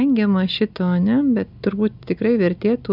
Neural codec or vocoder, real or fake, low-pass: none; real; 5.4 kHz